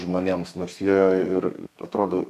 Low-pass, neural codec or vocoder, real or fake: 14.4 kHz; codec, 32 kHz, 1.9 kbps, SNAC; fake